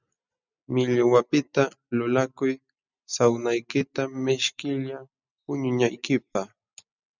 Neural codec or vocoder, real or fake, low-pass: none; real; 7.2 kHz